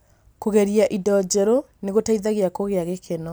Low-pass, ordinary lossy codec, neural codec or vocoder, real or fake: none; none; none; real